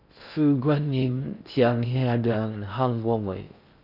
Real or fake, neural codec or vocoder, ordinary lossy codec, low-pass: fake; codec, 16 kHz in and 24 kHz out, 0.6 kbps, FocalCodec, streaming, 4096 codes; none; 5.4 kHz